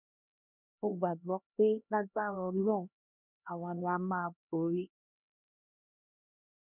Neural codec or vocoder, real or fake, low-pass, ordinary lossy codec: codec, 16 kHz, 1 kbps, X-Codec, HuBERT features, trained on LibriSpeech; fake; 3.6 kHz; none